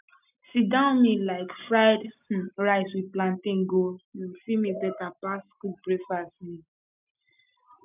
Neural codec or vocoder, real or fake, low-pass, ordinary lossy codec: none; real; 3.6 kHz; none